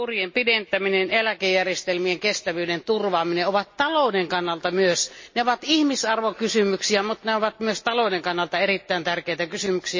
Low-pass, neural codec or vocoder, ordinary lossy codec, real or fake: 7.2 kHz; none; MP3, 32 kbps; real